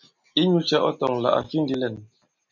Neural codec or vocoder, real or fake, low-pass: none; real; 7.2 kHz